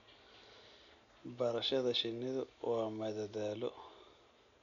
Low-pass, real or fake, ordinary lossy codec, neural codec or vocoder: 7.2 kHz; real; none; none